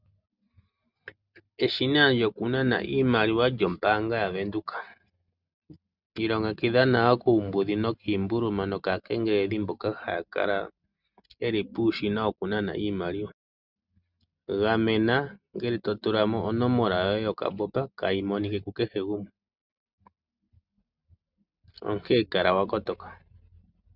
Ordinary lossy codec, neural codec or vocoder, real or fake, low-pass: Opus, 64 kbps; none; real; 5.4 kHz